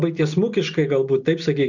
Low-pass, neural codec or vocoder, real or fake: 7.2 kHz; none; real